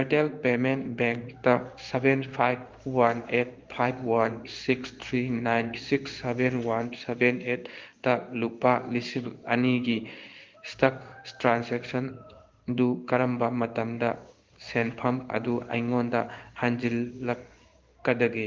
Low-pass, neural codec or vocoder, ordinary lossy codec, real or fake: 7.2 kHz; codec, 16 kHz in and 24 kHz out, 1 kbps, XY-Tokenizer; Opus, 32 kbps; fake